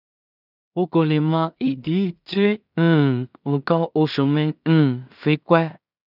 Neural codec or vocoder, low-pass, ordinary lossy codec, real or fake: codec, 16 kHz in and 24 kHz out, 0.4 kbps, LongCat-Audio-Codec, two codebook decoder; 5.4 kHz; none; fake